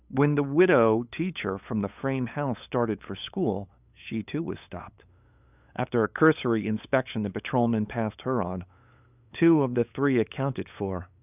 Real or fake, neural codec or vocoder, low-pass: fake; codec, 16 kHz, 8 kbps, FunCodec, trained on LibriTTS, 25 frames a second; 3.6 kHz